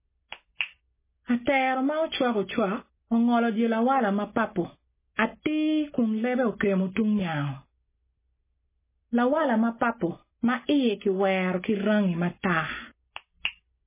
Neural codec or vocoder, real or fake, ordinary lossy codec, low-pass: none; real; MP3, 16 kbps; 3.6 kHz